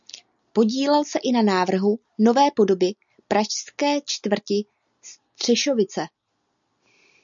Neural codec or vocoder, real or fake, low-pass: none; real; 7.2 kHz